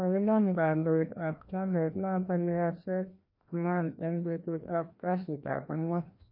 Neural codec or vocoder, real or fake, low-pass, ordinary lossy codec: codec, 16 kHz, 1 kbps, FreqCodec, larger model; fake; 5.4 kHz; MP3, 32 kbps